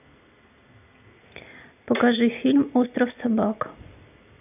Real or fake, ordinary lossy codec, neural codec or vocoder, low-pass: real; none; none; 3.6 kHz